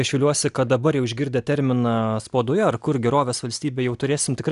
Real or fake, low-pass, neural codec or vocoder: real; 10.8 kHz; none